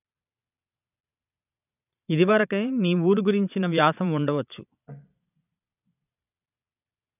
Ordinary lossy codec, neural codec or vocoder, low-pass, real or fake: none; vocoder, 22.05 kHz, 80 mel bands, Vocos; 3.6 kHz; fake